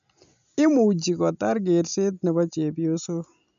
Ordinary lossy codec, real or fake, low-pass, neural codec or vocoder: none; real; 7.2 kHz; none